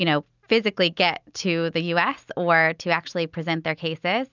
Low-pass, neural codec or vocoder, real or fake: 7.2 kHz; none; real